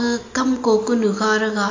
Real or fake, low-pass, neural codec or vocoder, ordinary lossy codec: real; 7.2 kHz; none; AAC, 48 kbps